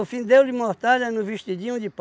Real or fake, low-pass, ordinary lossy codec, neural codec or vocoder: real; none; none; none